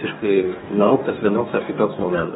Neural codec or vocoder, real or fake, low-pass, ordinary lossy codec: codec, 44.1 kHz, 2.6 kbps, DAC; fake; 19.8 kHz; AAC, 16 kbps